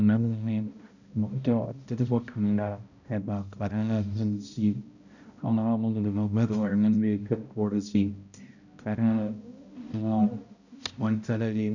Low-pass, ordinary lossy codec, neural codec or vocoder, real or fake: 7.2 kHz; none; codec, 16 kHz, 0.5 kbps, X-Codec, HuBERT features, trained on balanced general audio; fake